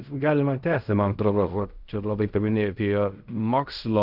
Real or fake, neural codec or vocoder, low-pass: fake; codec, 16 kHz in and 24 kHz out, 0.4 kbps, LongCat-Audio-Codec, fine tuned four codebook decoder; 5.4 kHz